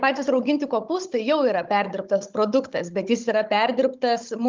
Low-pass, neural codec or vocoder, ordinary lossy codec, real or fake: 7.2 kHz; codec, 16 kHz, 16 kbps, FunCodec, trained on Chinese and English, 50 frames a second; Opus, 24 kbps; fake